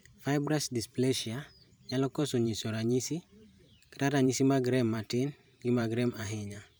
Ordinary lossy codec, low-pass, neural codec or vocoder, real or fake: none; none; vocoder, 44.1 kHz, 128 mel bands every 512 samples, BigVGAN v2; fake